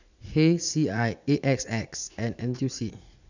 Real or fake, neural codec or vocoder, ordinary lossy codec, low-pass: fake; vocoder, 44.1 kHz, 80 mel bands, Vocos; none; 7.2 kHz